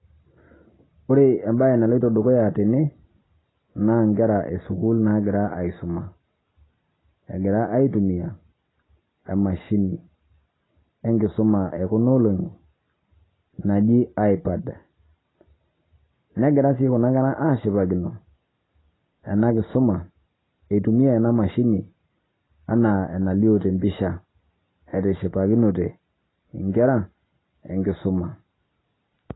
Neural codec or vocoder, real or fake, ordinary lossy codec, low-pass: none; real; AAC, 16 kbps; 7.2 kHz